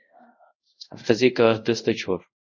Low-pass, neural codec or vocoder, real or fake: 7.2 kHz; codec, 24 kHz, 0.5 kbps, DualCodec; fake